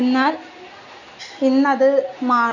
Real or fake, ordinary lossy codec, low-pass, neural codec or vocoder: real; none; 7.2 kHz; none